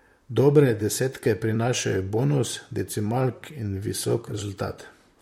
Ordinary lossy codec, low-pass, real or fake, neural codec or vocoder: MP3, 64 kbps; 19.8 kHz; fake; vocoder, 44.1 kHz, 128 mel bands, Pupu-Vocoder